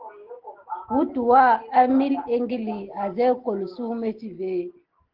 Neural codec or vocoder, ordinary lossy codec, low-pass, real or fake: none; Opus, 16 kbps; 5.4 kHz; real